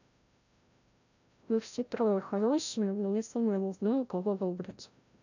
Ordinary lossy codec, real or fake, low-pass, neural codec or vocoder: none; fake; 7.2 kHz; codec, 16 kHz, 0.5 kbps, FreqCodec, larger model